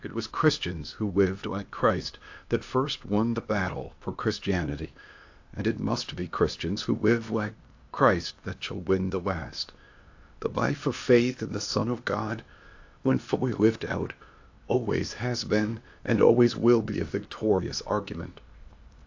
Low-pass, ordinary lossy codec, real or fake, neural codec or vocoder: 7.2 kHz; AAC, 48 kbps; fake; codec, 16 kHz, 0.8 kbps, ZipCodec